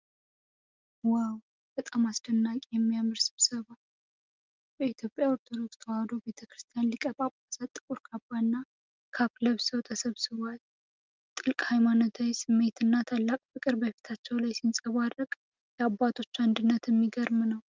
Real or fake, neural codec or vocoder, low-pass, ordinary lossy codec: real; none; 7.2 kHz; Opus, 32 kbps